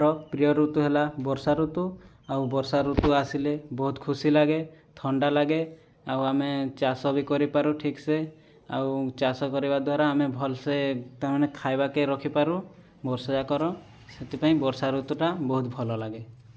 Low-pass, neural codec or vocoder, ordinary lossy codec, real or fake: none; none; none; real